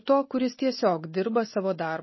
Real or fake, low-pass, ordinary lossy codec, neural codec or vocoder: real; 7.2 kHz; MP3, 24 kbps; none